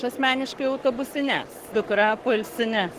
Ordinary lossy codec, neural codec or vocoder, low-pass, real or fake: Opus, 16 kbps; codec, 44.1 kHz, 7.8 kbps, Pupu-Codec; 14.4 kHz; fake